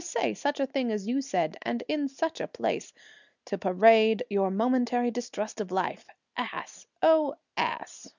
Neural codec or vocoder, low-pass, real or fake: none; 7.2 kHz; real